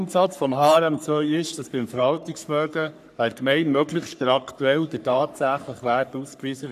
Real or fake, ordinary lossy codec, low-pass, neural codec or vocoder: fake; none; 14.4 kHz; codec, 44.1 kHz, 3.4 kbps, Pupu-Codec